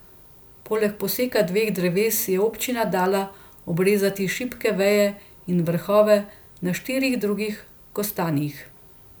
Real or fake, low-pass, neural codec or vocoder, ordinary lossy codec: real; none; none; none